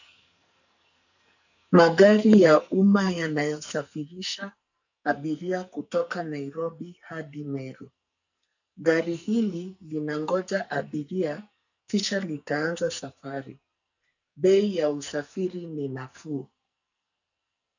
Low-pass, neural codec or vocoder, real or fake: 7.2 kHz; codec, 44.1 kHz, 2.6 kbps, SNAC; fake